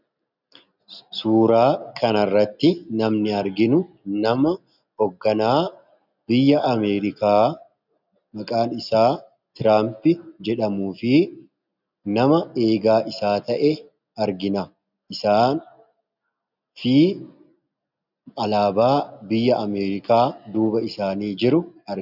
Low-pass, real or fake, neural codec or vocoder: 5.4 kHz; real; none